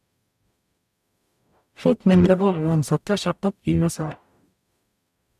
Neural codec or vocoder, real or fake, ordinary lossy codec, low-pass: codec, 44.1 kHz, 0.9 kbps, DAC; fake; none; 14.4 kHz